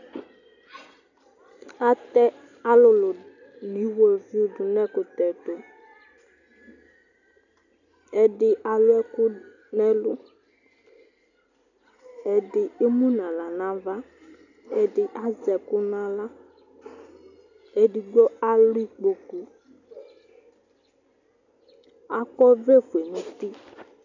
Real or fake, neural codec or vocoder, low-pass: real; none; 7.2 kHz